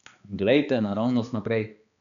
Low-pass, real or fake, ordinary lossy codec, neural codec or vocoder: 7.2 kHz; fake; none; codec, 16 kHz, 2 kbps, X-Codec, HuBERT features, trained on balanced general audio